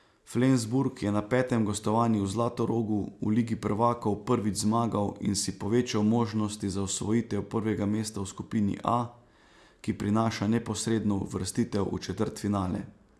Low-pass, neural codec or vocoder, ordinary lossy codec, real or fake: none; none; none; real